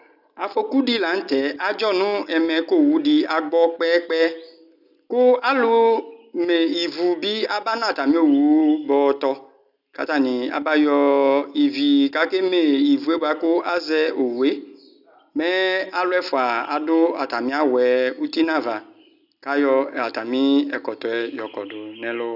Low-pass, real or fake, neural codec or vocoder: 5.4 kHz; real; none